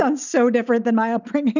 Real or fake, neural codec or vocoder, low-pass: real; none; 7.2 kHz